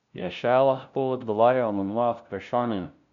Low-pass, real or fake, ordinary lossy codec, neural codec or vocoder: 7.2 kHz; fake; none; codec, 16 kHz, 0.5 kbps, FunCodec, trained on LibriTTS, 25 frames a second